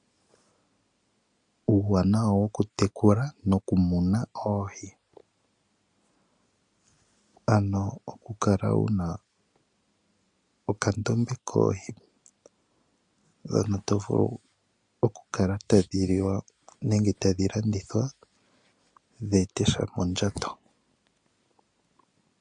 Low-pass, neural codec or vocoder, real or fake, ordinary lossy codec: 9.9 kHz; none; real; MP3, 64 kbps